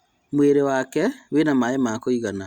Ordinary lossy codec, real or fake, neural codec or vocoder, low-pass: Opus, 64 kbps; real; none; 19.8 kHz